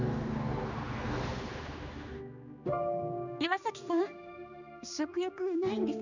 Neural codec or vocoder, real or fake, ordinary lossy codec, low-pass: codec, 16 kHz, 2 kbps, X-Codec, HuBERT features, trained on general audio; fake; none; 7.2 kHz